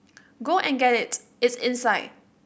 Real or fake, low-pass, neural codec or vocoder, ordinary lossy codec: real; none; none; none